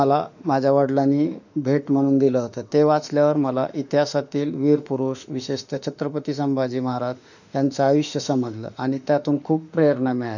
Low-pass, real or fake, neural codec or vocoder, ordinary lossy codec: 7.2 kHz; fake; autoencoder, 48 kHz, 32 numbers a frame, DAC-VAE, trained on Japanese speech; none